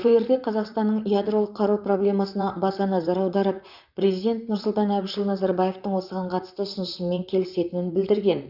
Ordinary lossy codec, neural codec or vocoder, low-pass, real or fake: none; vocoder, 44.1 kHz, 128 mel bands, Pupu-Vocoder; 5.4 kHz; fake